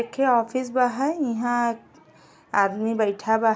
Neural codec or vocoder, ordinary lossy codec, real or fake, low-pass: none; none; real; none